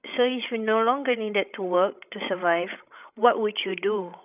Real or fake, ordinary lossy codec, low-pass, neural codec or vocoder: fake; none; 3.6 kHz; codec, 16 kHz, 16 kbps, FreqCodec, larger model